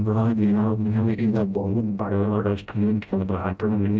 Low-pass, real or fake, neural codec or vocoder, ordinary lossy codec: none; fake; codec, 16 kHz, 0.5 kbps, FreqCodec, smaller model; none